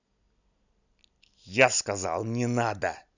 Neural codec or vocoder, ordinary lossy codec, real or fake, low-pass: none; none; real; 7.2 kHz